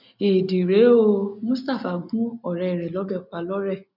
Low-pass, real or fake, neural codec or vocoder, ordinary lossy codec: 5.4 kHz; real; none; none